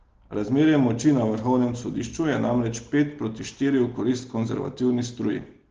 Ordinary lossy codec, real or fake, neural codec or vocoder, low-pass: Opus, 16 kbps; real; none; 7.2 kHz